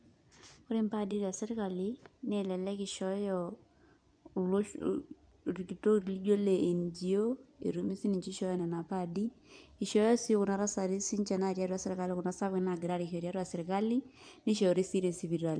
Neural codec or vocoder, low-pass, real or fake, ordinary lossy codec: vocoder, 24 kHz, 100 mel bands, Vocos; 9.9 kHz; fake; none